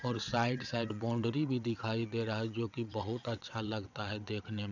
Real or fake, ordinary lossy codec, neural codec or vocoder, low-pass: fake; none; vocoder, 22.05 kHz, 80 mel bands, WaveNeXt; 7.2 kHz